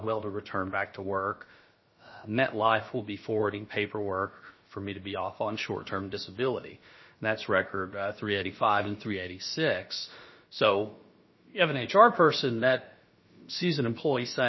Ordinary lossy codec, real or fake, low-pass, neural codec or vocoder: MP3, 24 kbps; fake; 7.2 kHz; codec, 16 kHz, about 1 kbps, DyCAST, with the encoder's durations